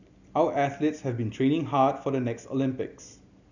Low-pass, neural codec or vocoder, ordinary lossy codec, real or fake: 7.2 kHz; none; none; real